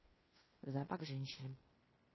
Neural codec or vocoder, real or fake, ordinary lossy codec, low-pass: codec, 16 kHz in and 24 kHz out, 0.9 kbps, LongCat-Audio-Codec, fine tuned four codebook decoder; fake; MP3, 24 kbps; 7.2 kHz